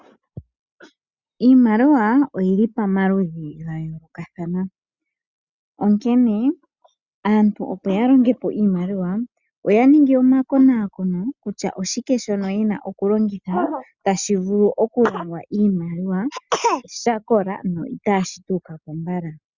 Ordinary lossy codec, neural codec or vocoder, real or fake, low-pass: Opus, 64 kbps; none; real; 7.2 kHz